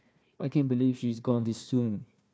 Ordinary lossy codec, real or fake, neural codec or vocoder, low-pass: none; fake; codec, 16 kHz, 1 kbps, FunCodec, trained on Chinese and English, 50 frames a second; none